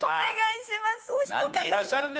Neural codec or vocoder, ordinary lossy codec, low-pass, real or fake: codec, 16 kHz, 2 kbps, FunCodec, trained on Chinese and English, 25 frames a second; none; none; fake